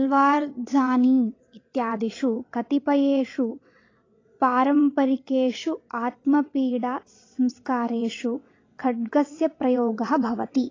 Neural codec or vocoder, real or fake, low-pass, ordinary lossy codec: vocoder, 22.05 kHz, 80 mel bands, WaveNeXt; fake; 7.2 kHz; AAC, 32 kbps